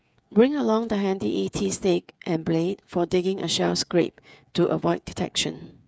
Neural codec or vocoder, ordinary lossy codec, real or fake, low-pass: codec, 16 kHz, 8 kbps, FreqCodec, smaller model; none; fake; none